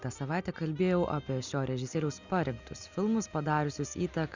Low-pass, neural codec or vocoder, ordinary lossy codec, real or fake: 7.2 kHz; none; Opus, 64 kbps; real